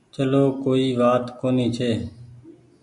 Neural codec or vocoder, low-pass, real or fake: none; 10.8 kHz; real